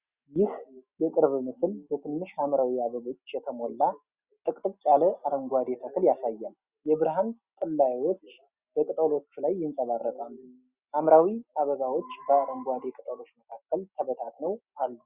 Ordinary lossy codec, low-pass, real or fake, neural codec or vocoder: Opus, 64 kbps; 3.6 kHz; real; none